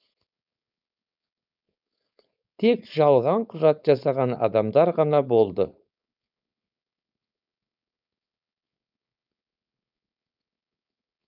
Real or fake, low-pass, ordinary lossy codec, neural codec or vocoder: fake; 5.4 kHz; none; codec, 16 kHz, 4.8 kbps, FACodec